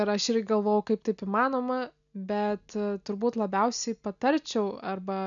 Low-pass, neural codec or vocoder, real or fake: 7.2 kHz; none; real